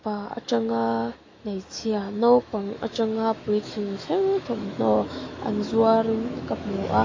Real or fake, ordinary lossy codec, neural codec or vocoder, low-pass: fake; AAC, 32 kbps; autoencoder, 48 kHz, 128 numbers a frame, DAC-VAE, trained on Japanese speech; 7.2 kHz